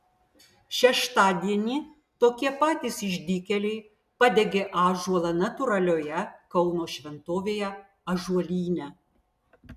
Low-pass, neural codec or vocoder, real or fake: 14.4 kHz; none; real